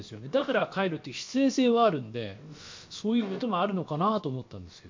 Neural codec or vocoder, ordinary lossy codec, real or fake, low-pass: codec, 16 kHz, about 1 kbps, DyCAST, with the encoder's durations; MP3, 48 kbps; fake; 7.2 kHz